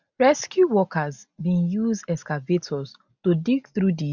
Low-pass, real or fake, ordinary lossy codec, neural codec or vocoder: 7.2 kHz; real; none; none